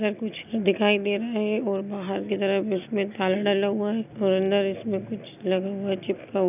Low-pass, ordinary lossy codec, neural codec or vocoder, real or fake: 3.6 kHz; none; none; real